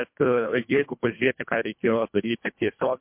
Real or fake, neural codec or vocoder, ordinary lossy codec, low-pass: fake; codec, 24 kHz, 1.5 kbps, HILCodec; MP3, 32 kbps; 3.6 kHz